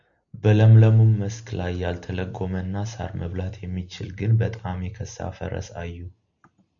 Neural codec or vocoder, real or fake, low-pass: none; real; 7.2 kHz